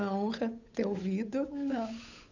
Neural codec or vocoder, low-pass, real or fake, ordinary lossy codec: codec, 16 kHz, 8 kbps, FunCodec, trained on Chinese and English, 25 frames a second; 7.2 kHz; fake; none